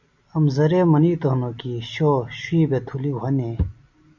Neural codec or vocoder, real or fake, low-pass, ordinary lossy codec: none; real; 7.2 kHz; MP3, 48 kbps